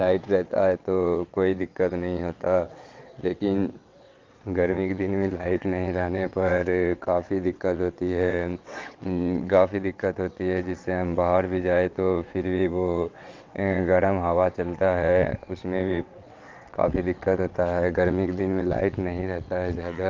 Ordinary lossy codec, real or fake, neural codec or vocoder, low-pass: Opus, 24 kbps; fake; vocoder, 22.05 kHz, 80 mel bands, WaveNeXt; 7.2 kHz